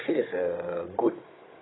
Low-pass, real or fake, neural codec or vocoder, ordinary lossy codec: 7.2 kHz; fake; codec, 16 kHz, 16 kbps, FunCodec, trained on Chinese and English, 50 frames a second; AAC, 16 kbps